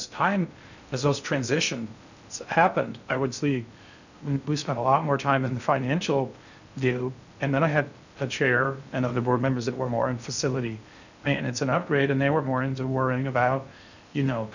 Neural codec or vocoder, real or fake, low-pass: codec, 16 kHz in and 24 kHz out, 0.6 kbps, FocalCodec, streaming, 2048 codes; fake; 7.2 kHz